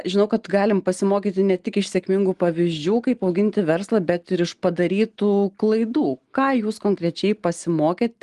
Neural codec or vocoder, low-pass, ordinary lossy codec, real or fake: none; 10.8 kHz; Opus, 24 kbps; real